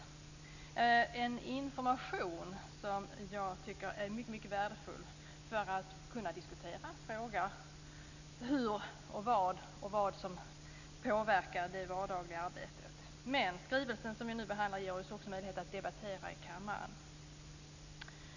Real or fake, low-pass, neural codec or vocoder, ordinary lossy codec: real; 7.2 kHz; none; none